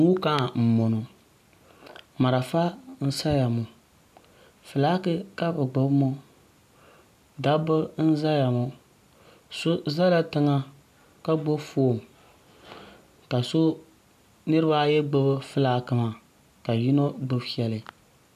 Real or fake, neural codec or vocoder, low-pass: real; none; 14.4 kHz